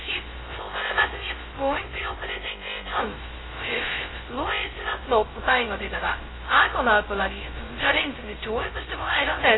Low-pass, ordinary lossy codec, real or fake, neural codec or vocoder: 7.2 kHz; AAC, 16 kbps; fake; codec, 16 kHz, 0.2 kbps, FocalCodec